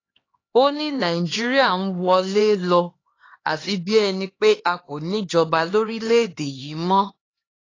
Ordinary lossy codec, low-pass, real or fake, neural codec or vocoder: AAC, 32 kbps; 7.2 kHz; fake; codec, 16 kHz, 2 kbps, X-Codec, HuBERT features, trained on LibriSpeech